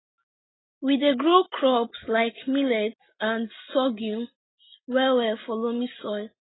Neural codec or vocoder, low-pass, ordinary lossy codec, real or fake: none; 7.2 kHz; AAC, 16 kbps; real